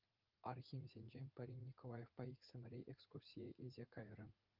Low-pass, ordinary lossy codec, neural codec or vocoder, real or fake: 5.4 kHz; Opus, 32 kbps; vocoder, 44.1 kHz, 80 mel bands, Vocos; fake